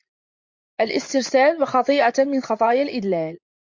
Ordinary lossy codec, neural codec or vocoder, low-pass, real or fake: MP3, 48 kbps; vocoder, 44.1 kHz, 80 mel bands, Vocos; 7.2 kHz; fake